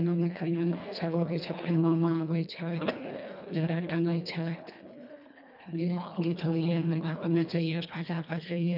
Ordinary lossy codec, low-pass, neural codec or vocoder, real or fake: none; 5.4 kHz; codec, 24 kHz, 1.5 kbps, HILCodec; fake